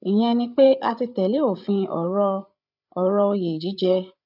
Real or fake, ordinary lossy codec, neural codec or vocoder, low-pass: fake; none; codec, 16 kHz, 8 kbps, FreqCodec, larger model; 5.4 kHz